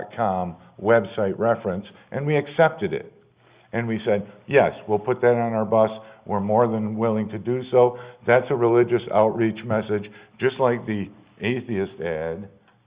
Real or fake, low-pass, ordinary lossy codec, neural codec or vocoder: real; 3.6 kHz; Opus, 64 kbps; none